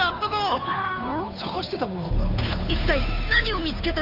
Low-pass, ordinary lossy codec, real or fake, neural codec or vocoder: 5.4 kHz; none; fake; codec, 16 kHz, 2 kbps, FunCodec, trained on Chinese and English, 25 frames a second